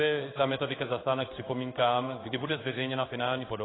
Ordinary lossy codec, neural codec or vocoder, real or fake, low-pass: AAC, 16 kbps; codec, 16 kHz in and 24 kHz out, 1 kbps, XY-Tokenizer; fake; 7.2 kHz